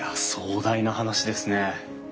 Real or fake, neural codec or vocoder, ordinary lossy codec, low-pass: real; none; none; none